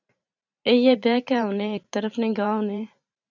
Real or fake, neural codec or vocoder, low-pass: fake; vocoder, 44.1 kHz, 128 mel bands every 512 samples, BigVGAN v2; 7.2 kHz